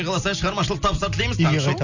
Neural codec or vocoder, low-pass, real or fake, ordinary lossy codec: none; 7.2 kHz; real; none